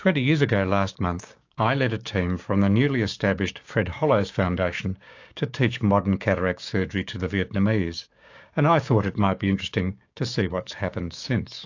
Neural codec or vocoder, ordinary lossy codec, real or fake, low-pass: codec, 16 kHz, 6 kbps, DAC; AAC, 48 kbps; fake; 7.2 kHz